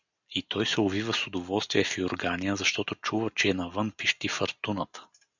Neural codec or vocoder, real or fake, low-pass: none; real; 7.2 kHz